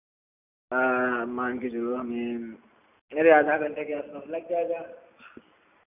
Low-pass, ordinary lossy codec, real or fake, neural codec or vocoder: 3.6 kHz; none; real; none